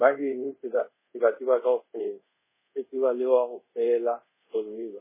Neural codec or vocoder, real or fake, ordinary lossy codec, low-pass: codec, 24 kHz, 0.5 kbps, DualCodec; fake; MP3, 16 kbps; 3.6 kHz